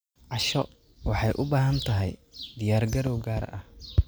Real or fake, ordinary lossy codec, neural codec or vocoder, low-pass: real; none; none; none